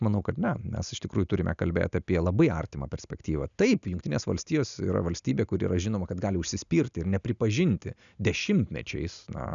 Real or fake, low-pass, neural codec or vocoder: real; 7.2 kHz; none